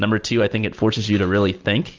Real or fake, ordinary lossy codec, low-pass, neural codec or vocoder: real; Opus, 24 kbps; 7.2 kHz; none